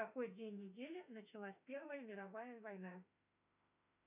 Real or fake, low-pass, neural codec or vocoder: fake; 3.6 kHz; autoencoder, 48 kHz, 32 numbers a frame, DAC-VAE, trained on Japanese speech